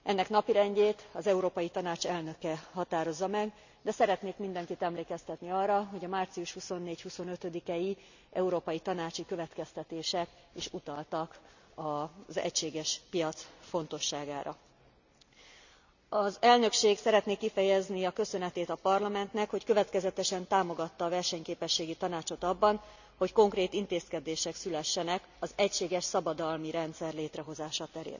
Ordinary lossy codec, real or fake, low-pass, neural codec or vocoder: none; real; 7.2 kHz; none